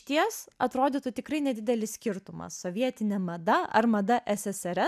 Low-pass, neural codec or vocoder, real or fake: 14.4 kHz; none; real